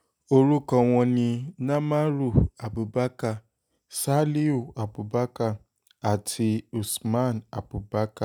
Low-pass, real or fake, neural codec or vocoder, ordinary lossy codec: none; real; none; none